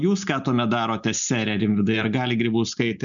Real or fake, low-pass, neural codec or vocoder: real; 7.2 kHz; none